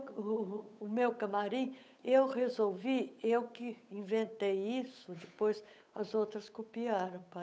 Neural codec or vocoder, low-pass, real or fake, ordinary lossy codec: codec, 16 kHz, 8 kbps, FunCodec, trained on Chinese and English, 25 frames a second; none; fake; none